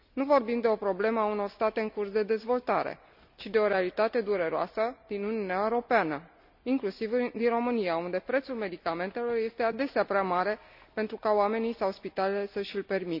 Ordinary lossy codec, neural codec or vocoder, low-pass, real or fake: none; none; 5.4 kHz; real